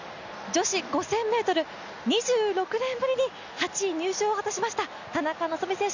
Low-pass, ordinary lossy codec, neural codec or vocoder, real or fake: 7.2 kHz; none; none; real